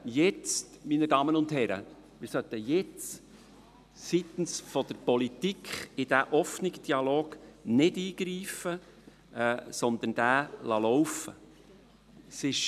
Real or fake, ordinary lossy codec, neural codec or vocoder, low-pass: real; none; none; 14.4 kHz